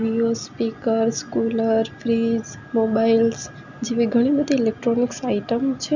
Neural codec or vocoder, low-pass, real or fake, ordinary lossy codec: none; 7.2 kHz; real; none